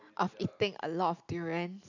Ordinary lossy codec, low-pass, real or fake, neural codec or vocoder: AAC, 48 kbps; 7.2 kHz; real; none